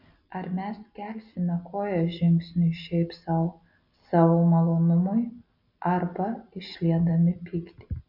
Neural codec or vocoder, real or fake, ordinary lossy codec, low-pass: none; real; MP3, 48 kbps; 5.4 kHz